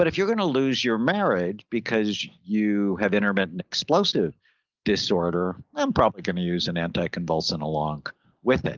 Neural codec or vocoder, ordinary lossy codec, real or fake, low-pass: none; Opus, 32 kbps; real; 7.2 kHz